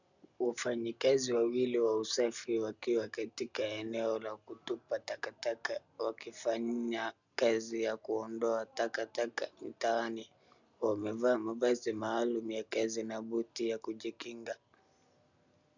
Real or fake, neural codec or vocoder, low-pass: real; none; 7.2 kHz